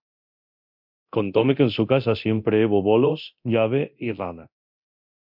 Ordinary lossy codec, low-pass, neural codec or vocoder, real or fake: MP3, 48 kbps; 5.4 kHz; codec, 24 kHz, 0.9 kbps, DualCodec; fake